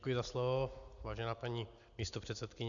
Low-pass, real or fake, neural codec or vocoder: 7.2 kHz; real; none